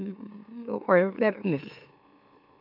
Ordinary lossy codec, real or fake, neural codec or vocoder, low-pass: none; fake; autoencoder, 44.1 kHz, a latent of 192 numbers a frame, MeloTTS; 5.4 kHz